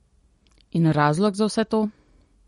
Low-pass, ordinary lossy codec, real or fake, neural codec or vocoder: 19.8 kHz; MP3, 48 kbps; real; none